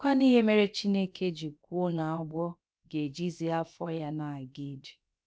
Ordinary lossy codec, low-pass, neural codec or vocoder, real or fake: none; none; codec, 16 kHz, about 1 kbps, DyCAST, with the encoder's durations; fake